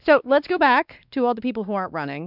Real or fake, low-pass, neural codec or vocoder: fake; 5.4 kHz; codec, 16 kHz, 2 kbps, X-Codec, WavLM features, trained on Multilingual LibriSpeech